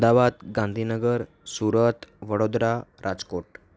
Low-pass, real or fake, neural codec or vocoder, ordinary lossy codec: none; real; none; none